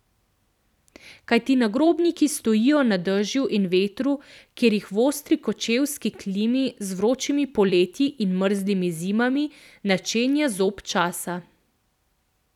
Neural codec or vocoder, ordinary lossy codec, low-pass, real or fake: vocoder, 44.1 kHz, 128 mel bands every 256 samples, BigVGAN v2; none; 19.8 kHz; fake